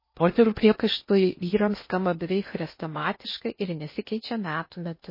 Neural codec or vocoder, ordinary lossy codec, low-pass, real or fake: codec, 16 kHz in and 24 kHz out, 0.8 kbps, FocalCodec, streaming, 65536 codes; MP3, 24 kbps; 5.4 kHz; fake